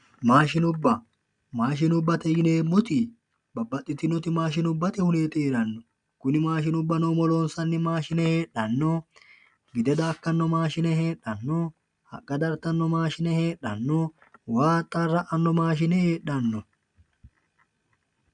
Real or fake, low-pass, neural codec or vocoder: real; 9.9 kHz; none